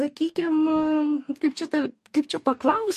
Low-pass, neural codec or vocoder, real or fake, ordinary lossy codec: 14.4 kHz; codec, 44.1 kHz, 2.6 kbps, DAC; fake; MP3, 64 kbps